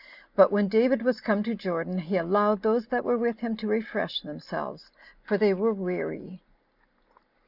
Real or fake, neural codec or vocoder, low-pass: real; none; 5.4 kHz